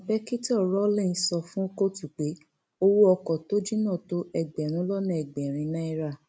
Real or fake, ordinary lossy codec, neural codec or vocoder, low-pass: real; none; none; none